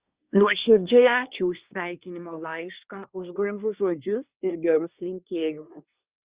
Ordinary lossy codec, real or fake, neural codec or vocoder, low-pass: Opus, 64 kbps; fake; codec, 24 kHz, 1 kbps, SNAC; 3.6 kHz